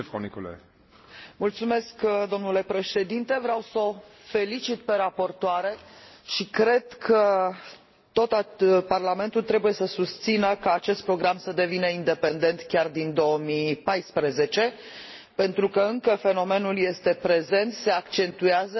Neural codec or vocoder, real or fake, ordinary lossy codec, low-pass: none; real; MP3, 24 kbps; 7.2 kHz